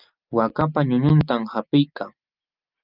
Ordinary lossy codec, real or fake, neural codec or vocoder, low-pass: Opus, 24 kbps; real; none; 5.4 kHz